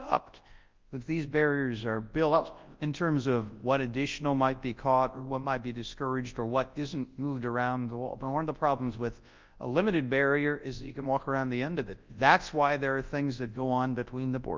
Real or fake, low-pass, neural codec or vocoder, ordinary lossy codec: fake; 7.2 kHz; codec, 24 kHz, 0.9 kbps, WavTokenizer, large speech release; Opus, 32 kbps